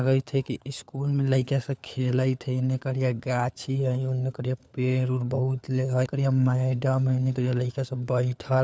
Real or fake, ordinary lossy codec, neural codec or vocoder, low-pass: fake; none; codec, 16 kHz, 4 kbps, FunCodec, trained on LibriTTS, 50 frames a second; none